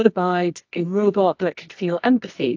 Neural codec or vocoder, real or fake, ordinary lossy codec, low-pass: codec, 24 kHz, 0.9 kbps, WavTokenizer, medium music audio release; fake; AAC, 48 kbps; 7.2 kHz